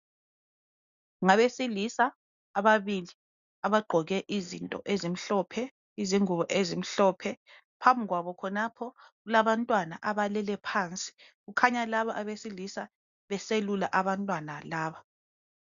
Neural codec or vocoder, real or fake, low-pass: none; real; 7.2 kHz